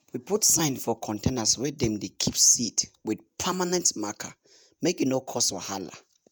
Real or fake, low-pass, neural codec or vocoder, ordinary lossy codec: fake; none; vocoder, 48 kHz, 128 mel bands, Vocos; none